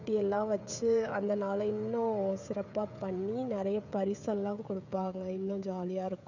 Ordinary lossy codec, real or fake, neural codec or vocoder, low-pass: none; fake; codec, 16 kHz, 16 kbps, FreqCodec, smaller model; 7.2 kHz